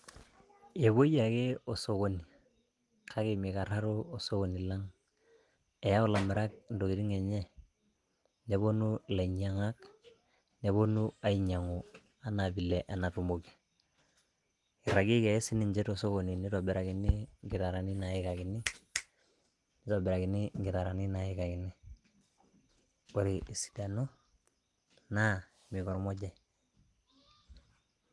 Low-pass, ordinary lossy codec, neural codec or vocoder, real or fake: 10.8 kHz; Opus, 24 kbps; none; real